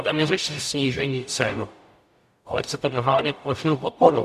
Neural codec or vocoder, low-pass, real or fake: codec, 44.1 kHz, 0.9 kbps, DAC; 14.4 kHz; fake